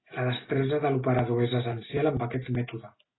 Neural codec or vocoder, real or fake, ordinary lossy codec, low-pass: none; real; AAC, 16 kbps; 7.2 kHz